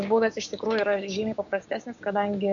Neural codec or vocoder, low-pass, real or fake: codec, 16 kHz, 6 kbps, DAC; 7.2 kHz; fake